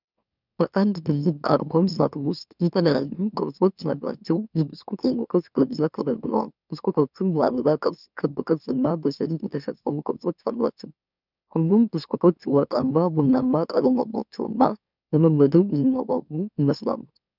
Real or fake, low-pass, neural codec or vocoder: fake; 5.4 kHz; autoencoder, 44.1 kHz, a latent of 192 numbers a frame, MeloTTS